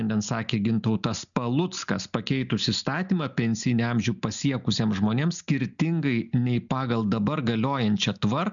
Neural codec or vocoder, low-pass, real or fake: none; 7.2 kHz; real